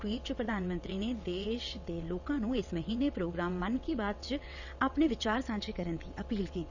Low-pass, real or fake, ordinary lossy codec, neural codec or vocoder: 7.2 kHz; fake; none; vocoder, 22.05 kHz, 80 mel bands, WaveNeXt